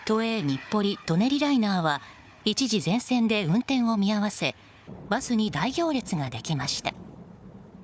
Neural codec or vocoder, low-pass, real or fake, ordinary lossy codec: codec, 16 kHz, 4 kbps, FunCodec, trained on Chinese and English, 50 frames a second; none; fake; none